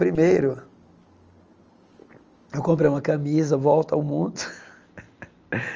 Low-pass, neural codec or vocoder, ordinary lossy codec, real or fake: 7.2 kHz; none; Opus, 24 kbps; real